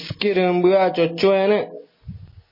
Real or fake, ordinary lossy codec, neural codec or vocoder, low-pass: real; MP3, 24 kbps; none; 5.4 kHz